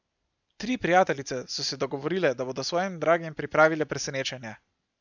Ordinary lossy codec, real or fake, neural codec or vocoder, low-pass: none; real; none; 7.2 kHz